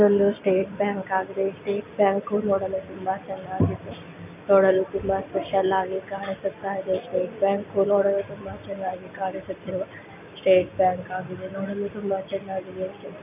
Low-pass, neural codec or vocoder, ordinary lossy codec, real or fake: 3.6 kHz; none; none; real